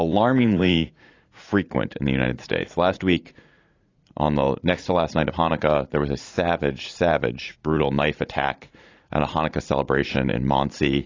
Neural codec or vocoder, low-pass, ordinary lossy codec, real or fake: vocoder, 44.1 kHz, 80 mel bands, Vocos; 7.2 kHz; AAC, 32 kbps; fake